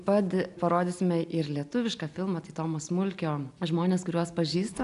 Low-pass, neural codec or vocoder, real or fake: 10.8 kHz; none; real